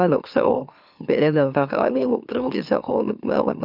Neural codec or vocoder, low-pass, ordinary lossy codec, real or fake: autoencoder, 44.1 kHz, a latent of 192 numbers a frame, MeloTTS; 5.4 kHz; none; fake